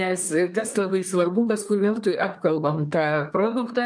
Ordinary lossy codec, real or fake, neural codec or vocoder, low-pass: MP3, 96 kbps; fake; codec, 24 kHz, 1 kbps, SNAC; 9.9 kHz